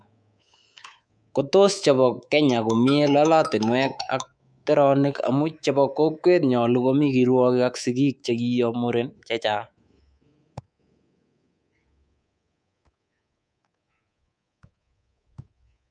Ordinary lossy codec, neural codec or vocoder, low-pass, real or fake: none; codec, 24 kHz, 3.1 kbps, DualCodec; 9.9 kHz; fake